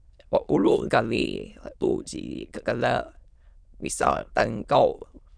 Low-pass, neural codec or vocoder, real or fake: 9.9 kHz; autoencoder, 22.05 kHz, a latent of 192 numbers a frame, VITS, trained on many speakers; fake